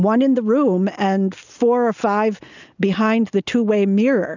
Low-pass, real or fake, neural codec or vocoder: 7.2 kHz; real; none